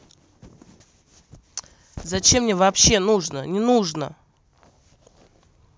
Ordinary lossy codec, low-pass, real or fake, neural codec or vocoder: none; none; real; none